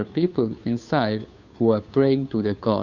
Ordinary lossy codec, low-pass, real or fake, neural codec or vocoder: none; 7.2 kHz; fake; codec, 16 kHz, 2 kbps, FunCodec, trained on Chinese and English, 25 frames a second